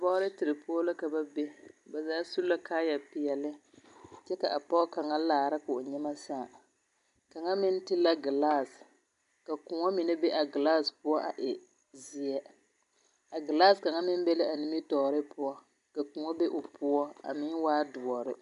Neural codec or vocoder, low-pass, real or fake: none; 10.8 kHz; real